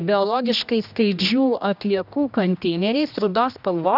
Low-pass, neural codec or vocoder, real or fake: 5.4 kHz; codec, 16 kHz, 1 kbps, X-Codec, HuBERT features, trained on general audio; fake